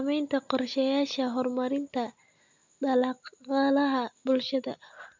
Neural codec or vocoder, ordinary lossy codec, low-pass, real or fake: none; MP3, 64 kbps; 7.2 kHz; real